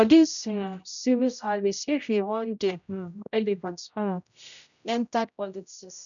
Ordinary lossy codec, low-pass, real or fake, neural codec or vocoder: none; 7.2 kHz; fake; codec, 16 kHz, 0.5 kbps, X-Codec, HuBERT features, trained on general audio